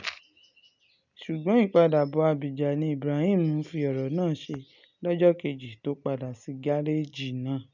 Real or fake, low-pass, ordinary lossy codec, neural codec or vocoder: real; 7.2 kHz; none; none